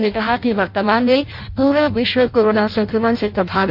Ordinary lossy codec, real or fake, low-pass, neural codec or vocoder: AAC, 48 kbps; fake; 5.4 kHz; codec, 16 kHz in and 24 kHz out, 0.6 kbps, FireRedTTS-2 codec